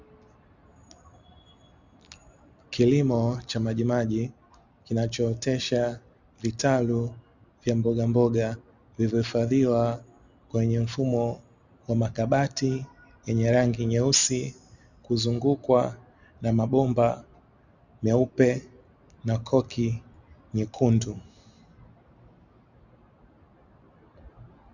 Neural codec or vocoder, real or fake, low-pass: none; real; 7.2 kHz